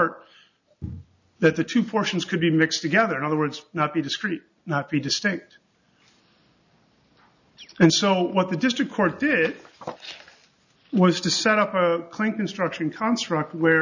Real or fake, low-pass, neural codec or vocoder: real; 7.2 kHz; none